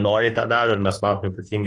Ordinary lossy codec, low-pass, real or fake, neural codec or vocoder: AAC, 32 kbps; 10.8 kHz; fake; autoencoder, 48 kHz, 32 numbers a frame, DAC-VAE, trained on Japanese speech